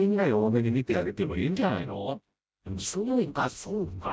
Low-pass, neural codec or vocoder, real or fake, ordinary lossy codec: none; codec, 16 kHz, 0.5 kbps, FreqCodec, smaller model; fake; none